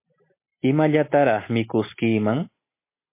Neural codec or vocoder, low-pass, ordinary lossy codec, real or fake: none; 3.6 kHz; MP3, 24 kbps; real